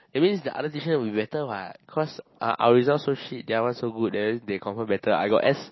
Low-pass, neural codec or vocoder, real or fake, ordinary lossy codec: 7.2 kHz; none; real; MP3, 24 kbps